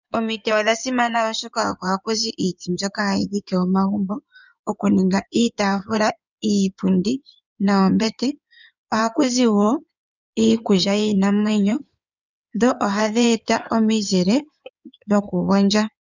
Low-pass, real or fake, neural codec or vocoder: 7.2 kHz; fake; codec, 16 kHz in and 24 kHz out, 2.2 kbps, FireRedTTS-2 codec